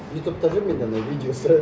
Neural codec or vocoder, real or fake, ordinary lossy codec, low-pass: none; real; none; none